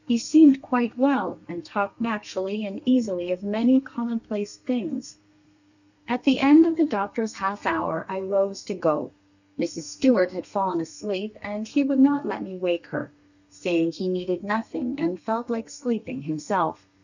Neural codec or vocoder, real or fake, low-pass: codec, 32 kHz, 1.9 kbps, SNAC; fake; 7.2 kHz